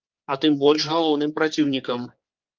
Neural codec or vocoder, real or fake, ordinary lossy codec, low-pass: codec, 16 kHz, 4 kbps, X-Codec, HuBERT features, trained on general audio; fake; Opus, 32 kbps; 7.2 kHz